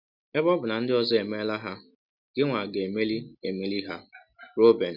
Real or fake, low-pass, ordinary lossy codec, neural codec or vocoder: real; 5.4 kHz; none; none